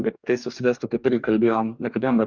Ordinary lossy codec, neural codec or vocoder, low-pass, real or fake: Opus, 64 kbps; codec, 44.1 kHz, 2.6 kbps, DAC; 7.2 kHz; fake